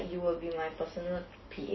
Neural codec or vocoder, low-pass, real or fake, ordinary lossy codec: none; 7.2 kHz; real; MP3, 24 kbps